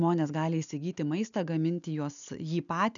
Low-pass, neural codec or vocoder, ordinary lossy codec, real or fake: 7.2 kHz; none; MP3, 96 kbps; real